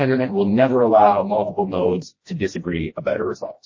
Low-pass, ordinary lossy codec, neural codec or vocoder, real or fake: 7.2 kHz; MP3, 32 kbps; codec, 16 kHz, 1 kbps, FreqCodec, smaller model; fake